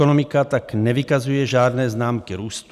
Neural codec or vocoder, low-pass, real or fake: none; 14.4 kHz; real